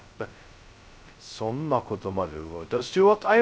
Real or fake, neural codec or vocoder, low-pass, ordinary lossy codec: fake; codec, 16 kHz, 0.2 kbps, FocalCodec; none; none